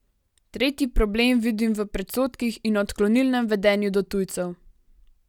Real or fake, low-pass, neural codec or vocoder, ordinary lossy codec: real; 19.8 kHz; none; none